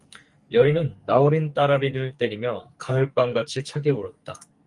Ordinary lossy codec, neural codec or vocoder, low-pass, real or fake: Opus, 32 kbps; codec, 44.1 kHz, 2.6 kbps, SNAC; 10.8 kHz; fake